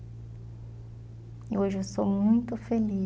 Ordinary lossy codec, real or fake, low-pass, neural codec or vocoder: none; real; none; none